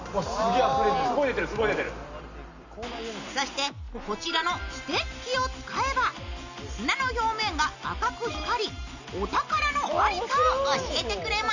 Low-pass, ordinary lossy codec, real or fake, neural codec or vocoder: 7.2 kHz; none; real; none